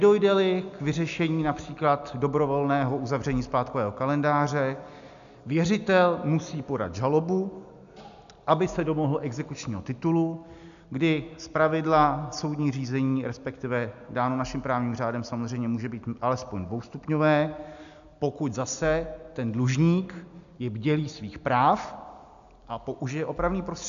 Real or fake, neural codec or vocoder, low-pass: real; none; 7.2 kHz